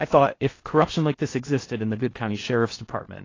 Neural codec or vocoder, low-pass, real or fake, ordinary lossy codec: codec, 16 kHz in and 24 kHz out, 0.6 kbps, FocalCodec, streaming, 4096 codes; 7.2 kHz; fake; AAC, 32 kbps